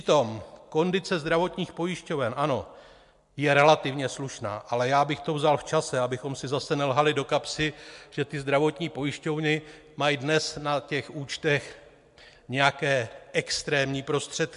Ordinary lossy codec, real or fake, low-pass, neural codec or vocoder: MP3, 64 kbps; real; 10.8 kHz; none